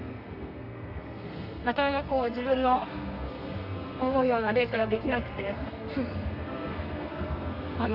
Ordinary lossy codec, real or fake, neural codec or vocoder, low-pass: none; fake; codec, 32 kHz, 1.9 kbps, SNAC; 5.4 kHz